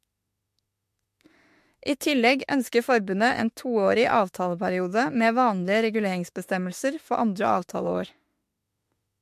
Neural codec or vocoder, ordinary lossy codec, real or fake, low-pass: autoencoder, 48 kHz, 32 numbers a frame, DAC-VAE, trained on Japanese speech; MP3, 64 kbps; fake; 14.4 kHz